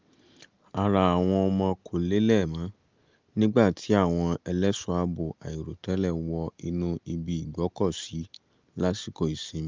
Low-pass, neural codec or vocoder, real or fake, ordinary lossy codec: 7.2 kHz; none; real; Opus, 32 kbps